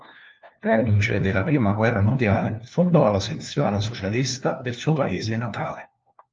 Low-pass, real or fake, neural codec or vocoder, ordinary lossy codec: 7.2 kHz; fake; codec, 16 kHz, 1 kbps, FunCodec, trained on LibriTTS, 50 frames a second; Opus, 24 kbps